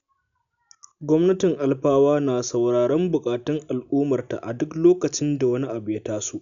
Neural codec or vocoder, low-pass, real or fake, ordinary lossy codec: none; 7.2 kHz; real; none